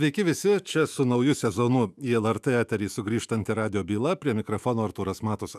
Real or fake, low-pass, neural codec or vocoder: fake; 14.4 kHz; autoencoder, 48 kHz, 128 numbers a frame, DAC-VAE, trained on Japanese speech